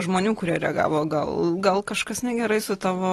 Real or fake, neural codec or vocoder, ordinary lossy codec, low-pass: real; none; AAC, 32 kbps; 14.4 kHz